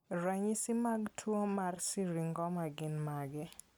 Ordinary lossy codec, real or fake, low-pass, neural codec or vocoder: none; real; none; none